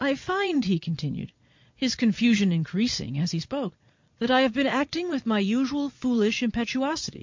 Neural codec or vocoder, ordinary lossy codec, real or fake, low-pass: vocoder, 22.05 kHz, 80 mel bands, Vocos; MP3, 48 kbps; fake; 7.2 kHz